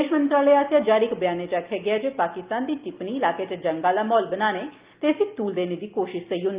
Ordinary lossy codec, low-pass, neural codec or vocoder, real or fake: Opus, 32 kbps; 3.6 kHz; none; real